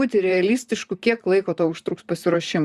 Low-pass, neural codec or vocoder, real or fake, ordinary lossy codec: 14.4 kHz; vocoder, 44.1 kHz, 128 mel bands, Pupu-Vocoder; fake; Opus, 64 kbps